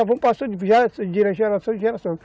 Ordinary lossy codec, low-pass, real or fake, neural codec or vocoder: none; none; real; none